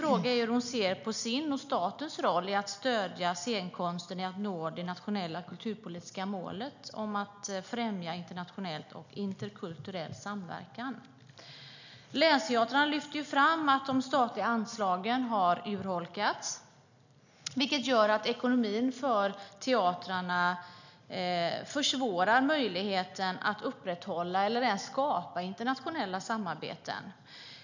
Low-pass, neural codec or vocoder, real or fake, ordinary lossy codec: 7.2 kHz; none; real; none